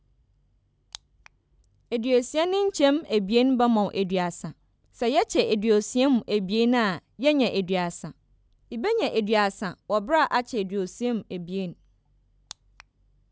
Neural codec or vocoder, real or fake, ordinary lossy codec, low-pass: none; real; none; none